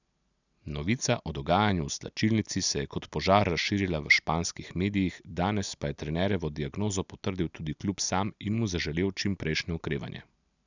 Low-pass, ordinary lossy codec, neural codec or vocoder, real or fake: 7.2 kHz; none; none; real